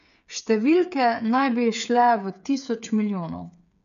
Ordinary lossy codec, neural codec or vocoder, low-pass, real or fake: none; codec, 16 kHz, 8 kbps, FreqCodec, smaller model; 7.2 kHz; fake